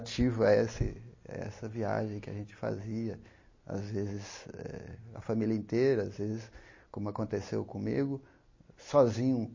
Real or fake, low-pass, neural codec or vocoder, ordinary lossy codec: real; 7.2 kHz; none; MP3, 32 kbps